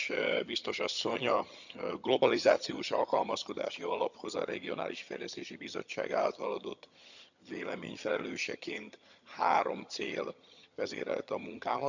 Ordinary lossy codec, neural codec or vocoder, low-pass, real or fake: none; vocoder, 22.05 kHz, 80 mel bands, HiFi-GAN; 7.2 kHz; fake